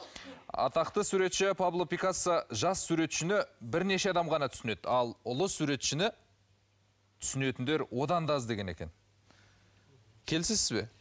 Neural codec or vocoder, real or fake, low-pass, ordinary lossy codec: none; real; none; none